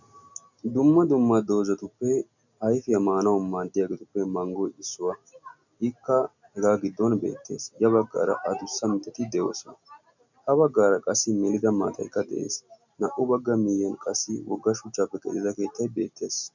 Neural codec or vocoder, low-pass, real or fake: none; 7.2 kHz; real